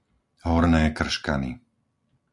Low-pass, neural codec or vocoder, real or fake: 10.8 kHz; none; real